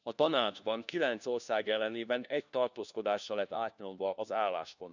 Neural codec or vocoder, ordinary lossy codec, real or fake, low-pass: codec, 16 kHz, 1 kbps, FunCodec, trained on LibriTTS, 50 frames a second; none; fake; 7.2 kHz